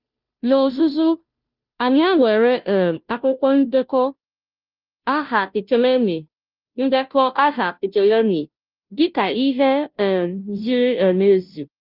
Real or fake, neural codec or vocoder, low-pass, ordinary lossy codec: fake; codec, 16 kHz, 0.5 kbps, FunCodec, trained on Chinese and English, 25 frames a second; 5.4 kHz; Opus, 24 kbps